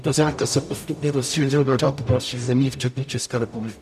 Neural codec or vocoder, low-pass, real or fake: codec, 44.1 kHz, 0.9 kbps, DAC; 14.4 kHz; fake